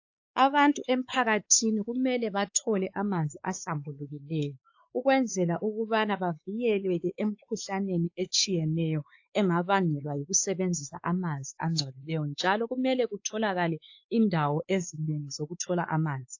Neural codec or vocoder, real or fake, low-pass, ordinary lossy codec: codec, 16 kHz, 4 kbps, X-Codec, WavLM features, trained on Multilingual LibriSpeech; fake; 7.2 kHz; AAC, 48 kbps